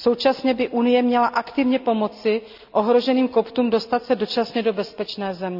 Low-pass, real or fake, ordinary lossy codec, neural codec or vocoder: 5.4 kHz; real; none; none